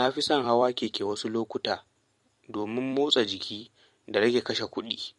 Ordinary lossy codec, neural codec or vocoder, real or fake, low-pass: MP3, 48 kbps; vocoder, 44.1 kHz, 128 mel bands every 256 samples, BigVGAN v2; fake; 14.4 kHz